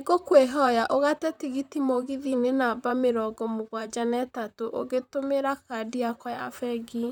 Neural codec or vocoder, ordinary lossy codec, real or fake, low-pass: vocoder, 44.1 kHz, 128 mel bands, Pupu-Vocoder; none; fake; 19.8 kHz